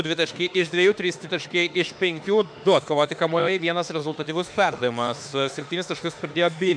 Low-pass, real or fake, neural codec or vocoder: 9.9 kHz; fake; autoencoder, 48 kHz, 32 numbers a frame, DAC-VAE, trained on Japanese speech